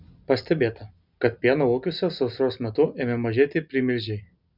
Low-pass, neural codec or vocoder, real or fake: 5.4 kHz; none; real